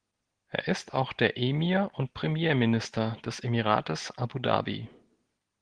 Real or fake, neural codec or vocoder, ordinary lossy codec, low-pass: real; none; Opus, 16 kbps; 9.9 kHz